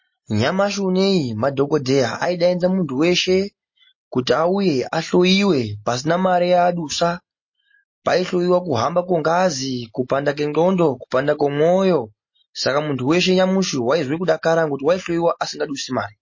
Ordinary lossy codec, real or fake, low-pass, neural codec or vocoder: MP3, 32 kbps; real; 7.2 kHz; none